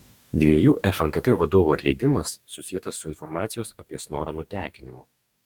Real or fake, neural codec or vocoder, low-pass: fake; codec, 44.1 kHz, 2.6 kbps, DAC; 19.8 kHz